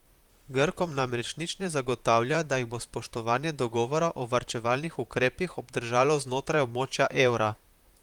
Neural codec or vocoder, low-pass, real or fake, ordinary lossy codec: vocoder, 44.1 kHz, 128 mel bands, Pupu-Vocoder; 19.8 kHz; fake; Opus, 32 kbps